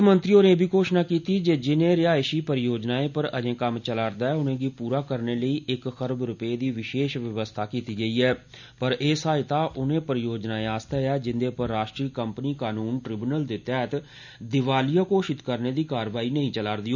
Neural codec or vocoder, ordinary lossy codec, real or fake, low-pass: none; none; real; 7.2 kHz